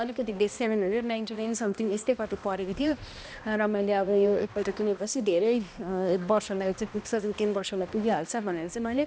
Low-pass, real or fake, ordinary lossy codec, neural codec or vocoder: none; fake; none; codec, 16 kHz, 1 kbps, X-Codec, HuBERT features, trained on balanced general audio